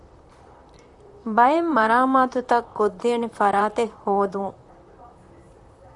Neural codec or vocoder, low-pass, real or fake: vocoder, 44.1 kHz, 128 mel bands, Pupu-Vocoder; 10.8 kHz; fake